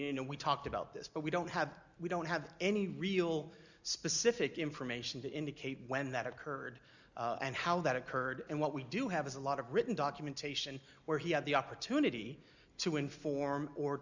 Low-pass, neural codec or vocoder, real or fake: 7.2 kHz; none; real